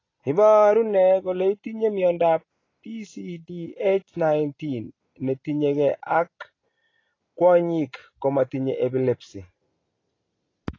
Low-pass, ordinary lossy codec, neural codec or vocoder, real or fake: 7.2 kHz; AAC, 32 kbps; none; real